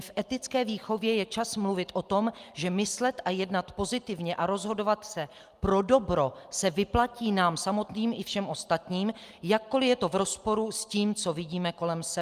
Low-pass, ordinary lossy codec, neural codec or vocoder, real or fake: 14.4 kHz; Opus, 32 kbps; none; real